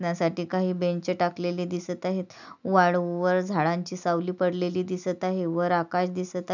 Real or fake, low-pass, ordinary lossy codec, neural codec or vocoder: real; 7.2 kHz; none; none